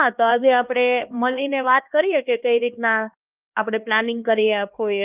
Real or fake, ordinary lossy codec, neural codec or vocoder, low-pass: fake; Opus, 32 kbps; codec, 16 kHz, 2 kbps, X-Codec, HuBERT features, trained on LibriSpeech; 3.6 kHz